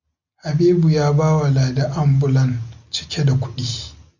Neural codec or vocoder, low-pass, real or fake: none; 7.2 kHz; real